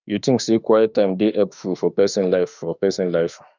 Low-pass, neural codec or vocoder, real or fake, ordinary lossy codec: 7.2 kHz; autoencoder, 48 kHz, 32 numbers a frame, DAC-VAE, trained on Japanese speech; fake; none